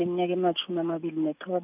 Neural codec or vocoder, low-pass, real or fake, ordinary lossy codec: none; 3.6 kHz; real; none